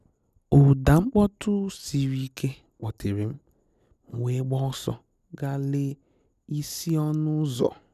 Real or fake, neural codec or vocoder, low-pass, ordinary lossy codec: real; none; 14.4 kHz; none